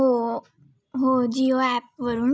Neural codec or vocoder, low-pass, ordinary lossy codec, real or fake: none; none; none; real